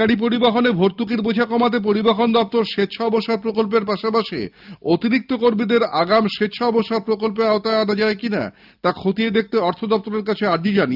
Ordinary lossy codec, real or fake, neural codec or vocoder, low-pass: Opus, 24 kbps; real; none; 5.4 kHz